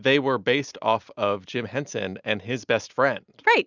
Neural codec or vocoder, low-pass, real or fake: none; 7.2 kHz; real